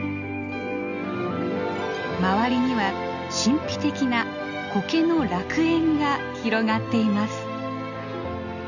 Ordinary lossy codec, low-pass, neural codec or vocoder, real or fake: none; 7.2 kHz; none; real